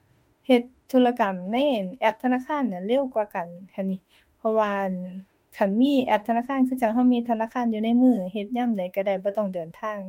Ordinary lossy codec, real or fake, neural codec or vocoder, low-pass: MP3, 64 kbps; fake; autoencoder, 48 kHz, 32 numbers a frame, DAC-VAE, trained on Japanese speech; 19.8 kHz